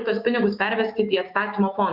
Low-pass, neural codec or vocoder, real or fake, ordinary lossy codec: 5.4 kHz; autoencoder, 48 kHz, 128 numbers a frame, DAC-VAE, trained on Japanese speech; fake; Opus, 64 kbps